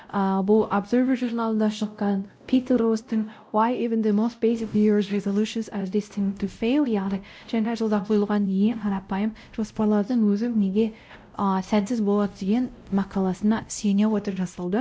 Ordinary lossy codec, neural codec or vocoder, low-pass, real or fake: none; codec, 16 kHz, 0.5 kbps, X-Codec, WavLM features, trained on Multilingual LibriSpeech; none; fake